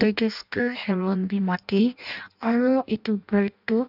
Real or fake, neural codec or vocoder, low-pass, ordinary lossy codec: fake; codec, 16 kHz in and 24 kHz out, 0.6 kbps, FireRedTTS-2 codec; 5.4 kHz; none